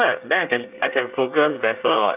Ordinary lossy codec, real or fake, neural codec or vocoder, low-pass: none; fake; codec, 24 kHz, 1 kbps, SNAC; 3.6 kHz